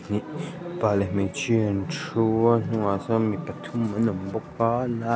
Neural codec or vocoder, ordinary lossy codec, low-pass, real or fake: none; none; none; real